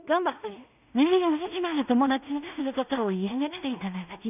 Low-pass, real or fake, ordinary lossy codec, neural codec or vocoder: 3.6 kHz; fake; none; codec, 16 kHz in and 24 kHz out, 0.4 kbps, LongCat-Audio-Codec, two codebook decoder